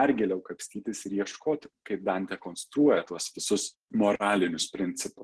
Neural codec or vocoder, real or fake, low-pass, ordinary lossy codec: none; real; 10.8 kHz; Opus, 16 kbps